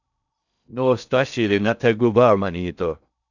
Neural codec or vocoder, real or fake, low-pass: codec, 16 kHz in and 24 kHz out, 0.6 kbps, FocalCodec, streaming, 2048 codes; fake; 7.2 kHz